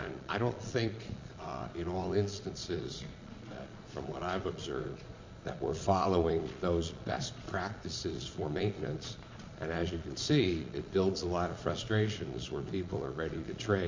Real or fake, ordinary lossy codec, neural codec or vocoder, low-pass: fake; MP3, 48 kbps; vocoder, 22.05 kHz, 80 mel bands, Vocos; 7.2 kHz